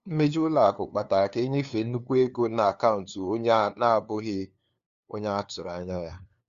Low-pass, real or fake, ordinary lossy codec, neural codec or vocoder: 7.2 kHz; fake; Opus, 64 kbps; codec, 16 kHz, 2 kbps, FunCodec, trained on LibriTTS, 25 frames a second